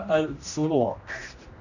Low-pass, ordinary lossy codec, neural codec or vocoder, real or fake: 7.2 kHz; none; codec, 16 kHz, 1 kbps, X-Codec, HuBERT features, trained on general audio; fake